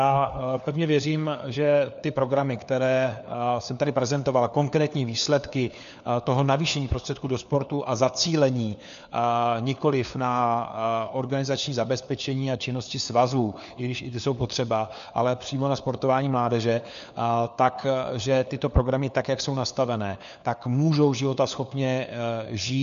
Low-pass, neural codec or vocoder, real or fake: 7.2 kHz; codec, 16 kHz, 4 kbps, FunCodec, trained on LibriTTS, 50 frames a second; fake